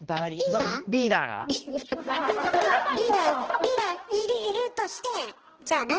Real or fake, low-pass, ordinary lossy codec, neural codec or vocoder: fake; 7.2 kHz; Opus, 16 kbps; codec, 16 kHz, 1 kbps, X-Codec, HuBERT features, trained on balanced general audio